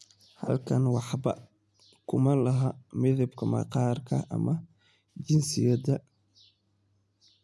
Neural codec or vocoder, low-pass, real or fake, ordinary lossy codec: none; none; real; none